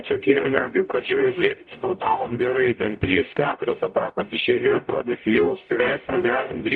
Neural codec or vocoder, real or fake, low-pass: codec, 44.1 kHz, 0.9 kbps, DAC; fake; 9.9 kHz